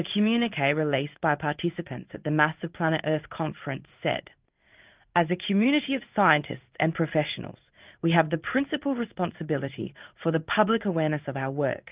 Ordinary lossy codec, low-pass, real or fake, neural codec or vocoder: Opus, 24 kbps; 3.6 kHz; fake; codec, 16 kHz in and 24 kHz out, 1 kbps, XY-Tokenizer